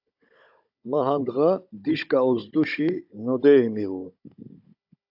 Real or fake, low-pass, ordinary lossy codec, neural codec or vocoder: fake; 5.4 kHz; AAC, 48 kbps; codec, 16 kHz, 16 kbps, FunCodec, trained on Chinese and English, 50 frames a second